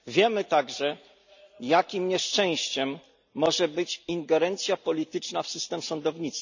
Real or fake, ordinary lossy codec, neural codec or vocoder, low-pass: real; none; none; 7.2 kHz